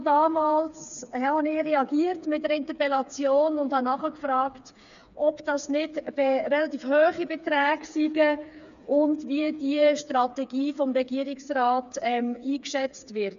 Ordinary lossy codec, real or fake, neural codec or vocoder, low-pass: none; fake; codec, 16 kHz, 4 kbps, FreqCodec, smaller model; 7.2 kHz